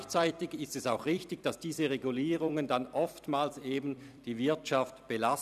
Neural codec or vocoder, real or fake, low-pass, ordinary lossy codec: vocoder, 44.1 kHz, 128 mel bands every 256 samples, BigVGAN v2; fake; 14.4 kHz; none